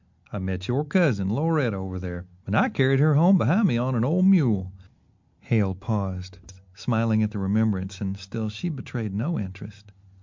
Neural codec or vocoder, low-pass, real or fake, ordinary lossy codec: none; 7.2 kHz; real; MP3, 64 kbps